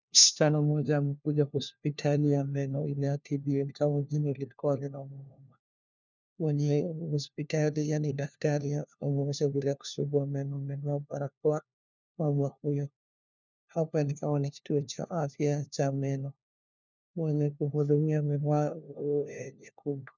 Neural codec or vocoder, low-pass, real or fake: codec, 16 kHz, 1 kbps, FunCodec, trained on LibriTTS, 50 frames a second; 7.2 kHz; fake